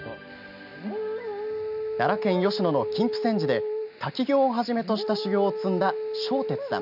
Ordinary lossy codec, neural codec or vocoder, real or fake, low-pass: none; none; real; 5.4 kHz